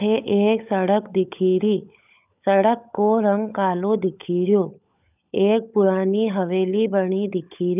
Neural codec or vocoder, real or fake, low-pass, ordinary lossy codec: codec, 16 kHz, 16 kbps, FunCodec, trained on LibriTTS, 50 frames a second; fake; 3.6 kHz; none